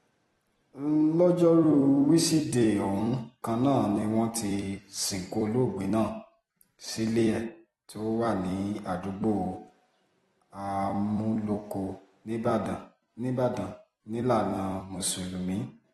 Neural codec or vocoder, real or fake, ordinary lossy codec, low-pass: vocoder, 44.1 kHz, 128 mel bands every 256 samples, BigVGAN v2; fake; AAC, 32 kbps; 19.8 kHz